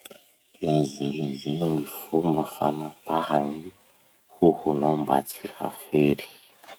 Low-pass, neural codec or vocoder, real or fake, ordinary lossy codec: none; codec, 44.1 kHz, 7.8 kbps, DAC; fake; none